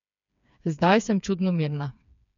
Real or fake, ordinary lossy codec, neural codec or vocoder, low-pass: fake; none; codec, 16 kHz, 4 kbps, FreqCodec, smaller model; 7.2 kHz